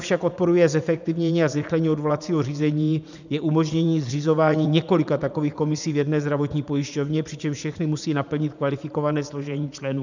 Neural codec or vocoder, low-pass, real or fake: vocoder, 44.1 kHz, 80 mel bands, Vocos; 7.2 kHz; fake